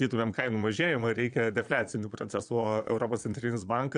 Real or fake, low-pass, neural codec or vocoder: fake; 9.9 kHz; vocoder, 22.05 kHz, 80 mel bands, Vocos